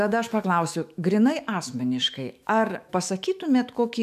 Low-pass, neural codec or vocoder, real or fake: 14.4 kHz; autoencoder, 48 kHz, 128 numbers a frame, DAC-VAE, trained on Japanese speech; fake